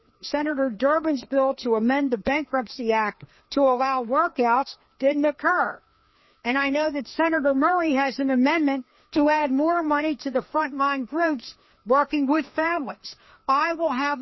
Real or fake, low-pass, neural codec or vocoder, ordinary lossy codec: fake; 7.2 kHz; codec, 16 kHz, 2 kbps, FreqCodec, larger model; MP3, 24 kbps